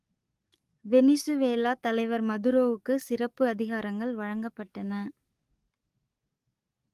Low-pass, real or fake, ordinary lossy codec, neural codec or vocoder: 14.4 kHz; fake; Opus, 32 kbps; codec, 44.1 kHz, 7.8 kbps, DAC